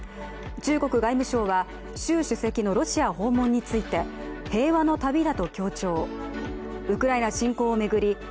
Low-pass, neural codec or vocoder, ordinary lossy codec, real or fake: none; none; none; real